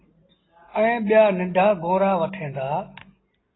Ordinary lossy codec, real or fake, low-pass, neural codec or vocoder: AAC, 16 kbps; real; 7.2 kHz; none